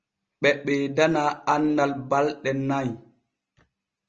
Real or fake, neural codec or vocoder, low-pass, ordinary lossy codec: real; none; 7.2 kHz; Opus, 24 kbps